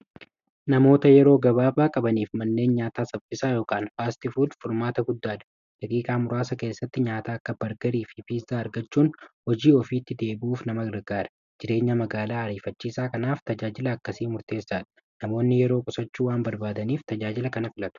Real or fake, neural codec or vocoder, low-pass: real; none; 7.2 kHz